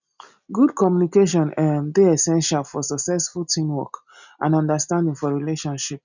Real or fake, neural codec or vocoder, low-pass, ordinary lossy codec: real; none; 7.2 kHz; none